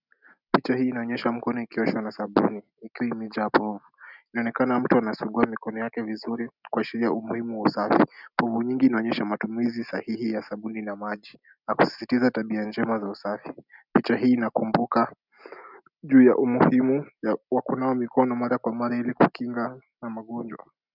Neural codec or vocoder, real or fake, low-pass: none; real; 5.4 kHz